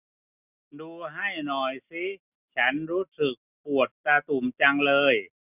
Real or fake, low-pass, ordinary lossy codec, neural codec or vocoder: real; 3.6 kHz; none; none